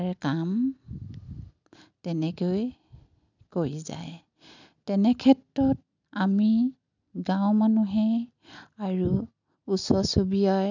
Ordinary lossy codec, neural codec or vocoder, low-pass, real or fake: none; none; 7.2 kHz; real